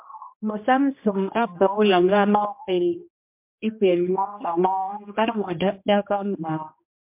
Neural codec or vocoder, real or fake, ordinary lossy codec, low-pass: codec, 16 kHz, 1 kbps, X-Codec, HuBERT features, trained on general audio; fake; MP3, 32 kbps; 3.6 kHz